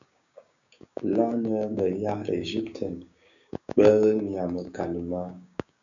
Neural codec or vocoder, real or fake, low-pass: codec, 16 kHz, 6 kbps, DAC; fake; 7.2 kHz